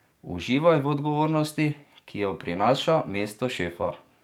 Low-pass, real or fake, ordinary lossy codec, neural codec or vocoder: 19.8 kHz; fake; none; codec, 44.1 kHz, 7.8 kbps, DAC